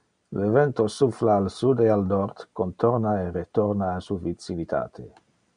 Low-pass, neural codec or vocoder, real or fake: 9.9 kHz; none; real